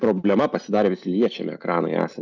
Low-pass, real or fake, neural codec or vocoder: 7.2 kHz; real; none